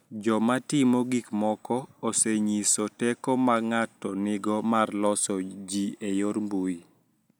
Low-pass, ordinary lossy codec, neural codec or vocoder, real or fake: none; none; none; real